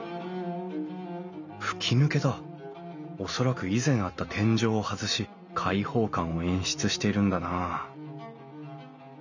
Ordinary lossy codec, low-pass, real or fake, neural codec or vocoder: none; 7.2 kHz; real; none